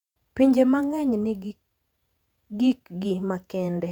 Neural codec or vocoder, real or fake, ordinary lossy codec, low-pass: vocoder, 48 kHz, 128 mel bands, Vocos; fake; none; 19.8 kHz